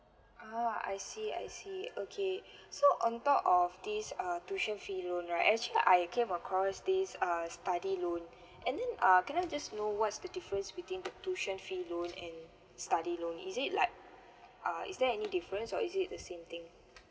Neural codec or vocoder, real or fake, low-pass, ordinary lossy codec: none; real; none; none